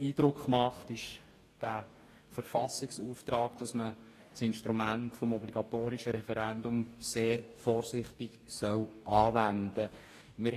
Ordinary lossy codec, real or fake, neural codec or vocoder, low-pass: AAC, 48 kbps; fake; codec, 44.1 kHz, 2.6 kbps, DAC; 14.4 kHz